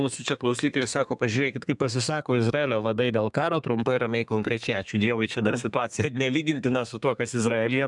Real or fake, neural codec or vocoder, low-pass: fake; codec, 32 kHz, 1.9 kbps, SNAC; 10.8 kHz